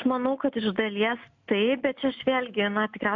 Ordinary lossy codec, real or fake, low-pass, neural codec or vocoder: MP3, 64 kbps; real; 7.2 kHz; none